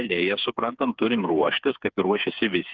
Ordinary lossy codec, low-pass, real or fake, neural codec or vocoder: Opus, 24 kbps; 7.2 kHz; fake; codec, 16 kHz, 4 kbps, FreqCodec, smaller model